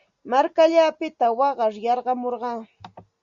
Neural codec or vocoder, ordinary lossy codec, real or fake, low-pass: none; Opus, 64 kbps; real; 7.2 kHz